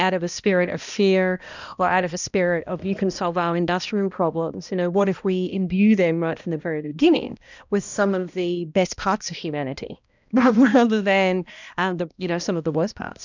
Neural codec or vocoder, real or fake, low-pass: codec, 16 kHz, 1 kbps, X-Codec, HuBERT features, trained on balanced general audio; fake; 7.2 kHz